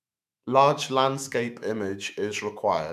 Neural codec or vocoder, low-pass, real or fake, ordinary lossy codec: autoencoder, 48 kHz, 128 numbers a frame, DAC-VAE, trained on Japanese speech; 14.4 kHz; fake; none